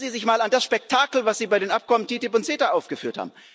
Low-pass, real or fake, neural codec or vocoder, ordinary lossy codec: none; real; none; none